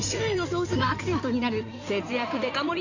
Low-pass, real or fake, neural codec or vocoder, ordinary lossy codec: 7.2 kHz; fake; codec, 16 kHz in and 24 kHz out, 2.2 kbps, FireRedTTS-2 codec; none